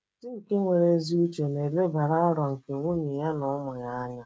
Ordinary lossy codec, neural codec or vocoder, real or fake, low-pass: none; codec, 16 kHz, 16 kbps, FreqCodec, smaller model; fake; none